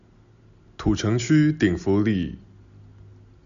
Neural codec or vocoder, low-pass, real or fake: none; 7.2 kHz; real